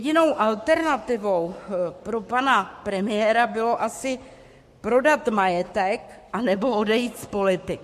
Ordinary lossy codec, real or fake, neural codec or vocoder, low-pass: MP3, 64 kbps; fake; codec, 44.1 kHz, 7.8 kbps, Pupu-Codec; 14.4 kHz